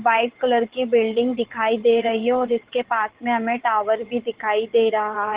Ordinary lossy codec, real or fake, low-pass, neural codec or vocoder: Opus, 16 kbps; fake; 3.6 kHz; vocoder, 22.05 kHz, 80 mel bands, Vocos